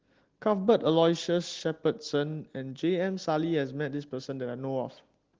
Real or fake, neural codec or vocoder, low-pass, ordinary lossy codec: real; none; 7.2 kHz; Opus, 16 kbps